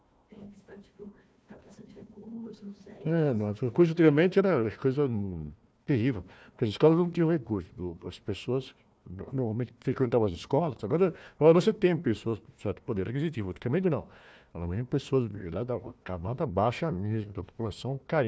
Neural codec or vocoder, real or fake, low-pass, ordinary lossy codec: codec, 16 kHz, 1 kbps, FunCodec, trained on Chinese and English, 50 frames a second; fake; none; none